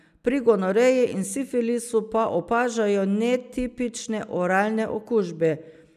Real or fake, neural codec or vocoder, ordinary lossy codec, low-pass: real; none; none; 14.4 kHz